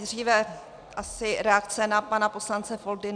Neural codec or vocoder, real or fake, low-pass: none; real; 9.9 kHz